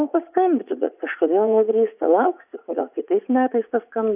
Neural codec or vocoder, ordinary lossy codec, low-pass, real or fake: vocoder, 44.1 kHz, 80 mel bands, Vocos; AAC, 32 kbps; 3.6 kHz; fake